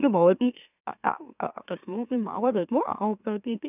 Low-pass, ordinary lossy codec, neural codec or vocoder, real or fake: 3.6 kHz; none; autoencoder, 44.1 kHz, a latent of 192 numbers a frame, MeloTTS; fake